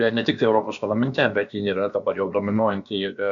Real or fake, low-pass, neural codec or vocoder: fake; 7.2 kHz; codec, 16 kHz, about 1 kbps, DyCAST, with the encoder's durations